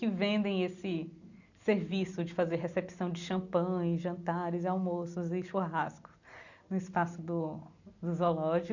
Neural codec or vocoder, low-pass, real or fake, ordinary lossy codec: none; 7.2 kHz; real; none